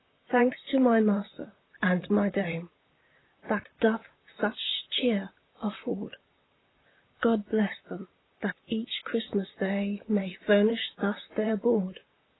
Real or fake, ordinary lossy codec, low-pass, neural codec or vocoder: fake; AAC, 16 kbps; 7.2 kHz; vocoder, 44.1 kHz, 128 mel bands, Pupu-Vocoder